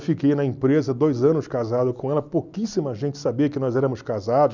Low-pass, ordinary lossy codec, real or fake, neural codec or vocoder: 7.2 kHz; none; real; none